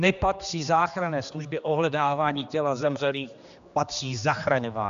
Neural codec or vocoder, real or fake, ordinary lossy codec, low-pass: codec, 16 kHz, 2 kbps, X-Codec, HuBERT features, trained on general audio; fake; AAC, 96 kbps; 7.2 kHz